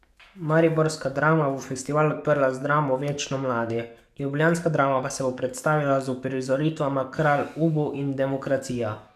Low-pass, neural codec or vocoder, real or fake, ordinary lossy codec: 14.4 kHz; codec, 44.1 kHz, 7.8 kbps, DAC; fake; none